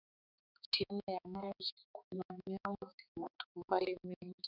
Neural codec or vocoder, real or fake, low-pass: codec, 16 kHz, 4 kbps, X-Codec, HuBERT features, trained on balanced general audio; fake; 5.4 kHz